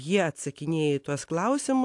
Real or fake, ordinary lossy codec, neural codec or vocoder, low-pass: real; AAC, 64 kbps; none; 10.8 kHz